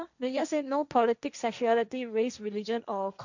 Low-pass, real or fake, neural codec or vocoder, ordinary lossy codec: 7.2 kHz; fake; codec, 16 kHz, 1.1 kbps, Voila-Tokenizer; none